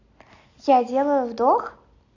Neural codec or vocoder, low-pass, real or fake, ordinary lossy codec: none; 7.2 kHz; real; none